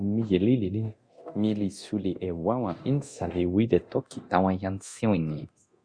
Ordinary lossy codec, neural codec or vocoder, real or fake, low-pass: Opus, 64 kbps; codec, 24 kHz, 0.9 kbps, DualCodec; fake; 9.9 kHz